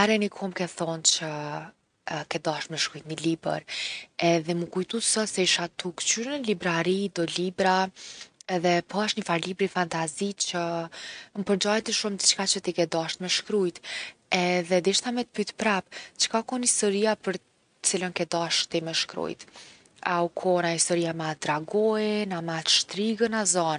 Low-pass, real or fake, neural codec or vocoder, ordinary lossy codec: 9.9 kHz; real; none; none